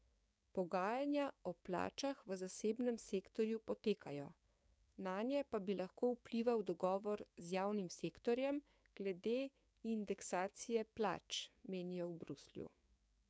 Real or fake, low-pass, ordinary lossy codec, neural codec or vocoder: fake; none; none; codec, 16 kHz, 6 kbps, DAC